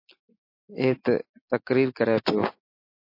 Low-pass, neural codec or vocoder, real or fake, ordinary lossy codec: 5.4 kHz; none; real; MP3, 32 kbps